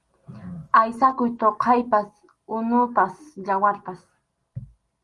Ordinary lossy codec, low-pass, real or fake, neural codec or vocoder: Opus, 24 kbps; 10.8 kHz; real; none